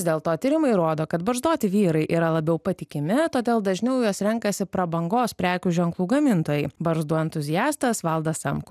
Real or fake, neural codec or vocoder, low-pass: real; none; 14.4 kHz